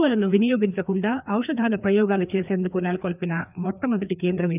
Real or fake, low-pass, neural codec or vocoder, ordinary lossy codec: fake; 3.6 kHz; codec, 16 kHz, 2 kbps, FreqCodec, larger model; none